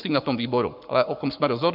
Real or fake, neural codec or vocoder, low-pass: fake; codec, 44.1 kHz, 7.8 kbps, Pupu-Codec; 5.4 kHz